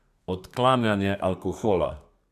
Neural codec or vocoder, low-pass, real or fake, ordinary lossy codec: codec, 32 kHz, 1.9 kbps, SNAC; 14.4 kHz; fake; AAC, 96 kbps